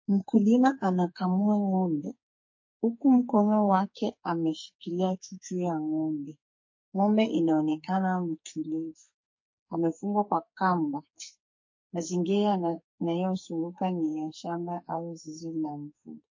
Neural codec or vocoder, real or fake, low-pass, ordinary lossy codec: codec, 44.1 kHz, 2.6 kbps, SNAC; fake; 7.2 kHz; MP3, 32 kbps